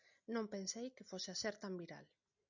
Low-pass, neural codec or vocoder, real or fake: 7.2 kHz; none; real